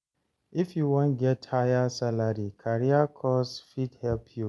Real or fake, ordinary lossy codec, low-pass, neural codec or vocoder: real; none; none; none